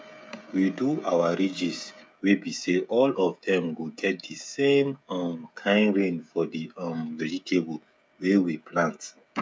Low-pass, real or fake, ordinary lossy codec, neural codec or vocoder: none; fake; none; codec, 16 kHz, 16 kbps, FreqCodec, smaller model